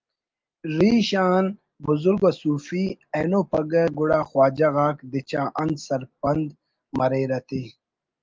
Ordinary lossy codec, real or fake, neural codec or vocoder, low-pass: Opus, 32 kbps; real; none; 7.2 kHz